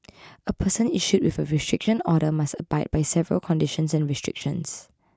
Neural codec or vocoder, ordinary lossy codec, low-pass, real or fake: none; none; none; real